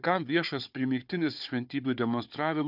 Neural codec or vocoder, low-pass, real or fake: codec, 16 kHz, 4 kbps, FunCodec, trained on Chinese and English, 50 frames a second; 5.4 kHz; fake